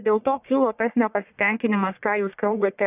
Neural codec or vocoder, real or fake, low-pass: codec, 44.1 kHz, 1.7 kbps, Pupu-Codec; fake; 3.6 kHz